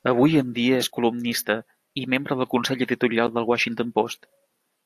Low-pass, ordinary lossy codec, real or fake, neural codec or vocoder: 14.4 kHz; AAC, 64 kbps; real; none